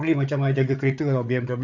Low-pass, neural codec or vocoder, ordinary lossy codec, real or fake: 7.2 kHz; codec, 16 kHz, 8 kbps, FreqCodec, larger model; none; fake